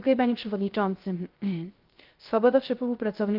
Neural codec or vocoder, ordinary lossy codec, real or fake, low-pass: codec, 16 kHz, 0.3 kbps, FocalCodec; Opus, 32 kbps; fake; 5.4 kHz